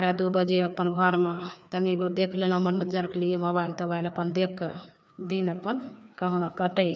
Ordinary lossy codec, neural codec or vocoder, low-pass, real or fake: none; codec, 16 kHz, 2 kbps, FreqCodec, larger model; none; fake